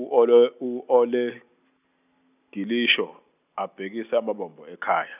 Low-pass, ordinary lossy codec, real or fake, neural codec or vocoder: 3.6 kHz; none; real; none